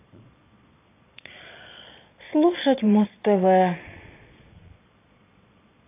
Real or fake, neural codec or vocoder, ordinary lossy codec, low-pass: fake; vocoder, 22.05 kHz, 80 mel bands, Vocos; AAC, 24 kbps; 3.6 kHz